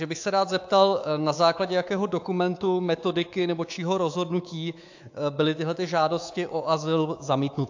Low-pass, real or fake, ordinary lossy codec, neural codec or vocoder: 7.2 kHz; fake; AAC, 48 kbps; codec, 24 kHz, 3.1 kbps, DualCodec